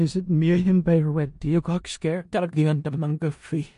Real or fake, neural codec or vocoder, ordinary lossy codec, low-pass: fake; codec, 16 kHz in and 24 kHz out, 0.4 kbps, LongCat-Audio-Codec, four codebook decoder; MP3, 48 kbps; 10.8 kHz